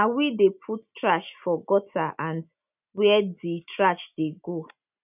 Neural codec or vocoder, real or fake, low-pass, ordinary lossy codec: none; real; 3.6 kHz; none